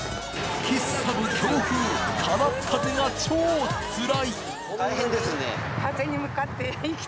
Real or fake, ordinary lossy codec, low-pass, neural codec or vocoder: real; none; none; none